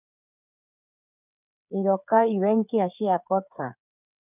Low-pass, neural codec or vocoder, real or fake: 3.6 kHz; codec, 16 kHz, 8 kbps, FreqCodec, smaller model; fake